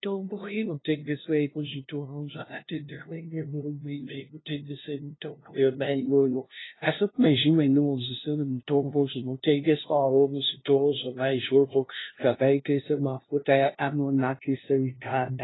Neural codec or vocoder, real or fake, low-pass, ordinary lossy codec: codec, 16 kHz, 0.5 kbps, FunCodec, trained on LibriTTS, 25 frames a second; fake; 7.2 kHz; AAC, 16 kbps